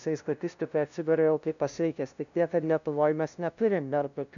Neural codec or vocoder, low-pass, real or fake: codec, 16 kHz, 0.5 kbps, FunCodec, trained on LibriTTS, 25 frames a second; 7.2 kHz; fake